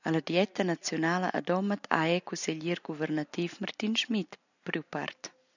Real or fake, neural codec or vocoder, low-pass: real; none; 7.2 kHz